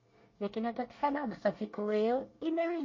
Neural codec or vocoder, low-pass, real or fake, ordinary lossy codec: codec, 24 kHz, 1 kbps, SNAC; 7.2 kHz; fake; MP3, 32 kbps